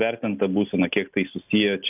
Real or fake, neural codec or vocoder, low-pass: real; none; 3.6 kHz